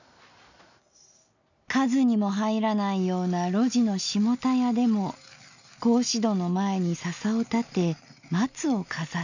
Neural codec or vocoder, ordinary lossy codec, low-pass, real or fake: none; none; 7.2 kHz; real